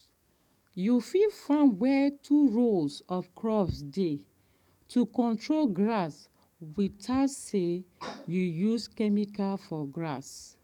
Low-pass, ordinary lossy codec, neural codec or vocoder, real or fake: 19.8 kHz; none; codec, 44.1 kHz, 7.8 kbps, DAC; fake